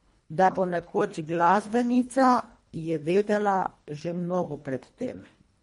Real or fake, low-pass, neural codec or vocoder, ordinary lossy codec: fake; 10.8 kHz; codec, 24 kHz, 1.5 kbps, HILCodec; MP3, 48 kbps